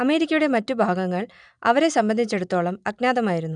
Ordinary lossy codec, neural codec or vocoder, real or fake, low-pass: none; none; real; 9.9 kHz